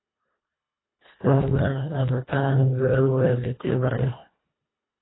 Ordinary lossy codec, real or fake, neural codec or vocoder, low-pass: AAC, 16 kbps; fake; codec, 24 kHz, 1.5 kbps, HILCodec; 7.2 kHz